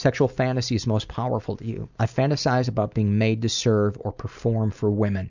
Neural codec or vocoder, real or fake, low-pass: none; real; 7.2 kHz